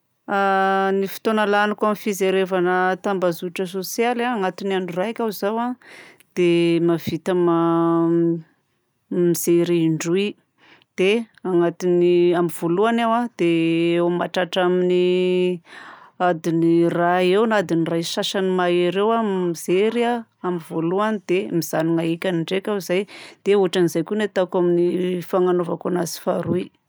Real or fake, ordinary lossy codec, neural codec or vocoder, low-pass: real; none; none; none